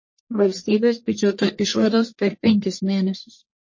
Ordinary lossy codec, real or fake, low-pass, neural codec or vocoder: MP3, 32 kbps; fake; 7.2 kHz; codec, 44.1 kHz, 1.7 kbps, Pupu-Codec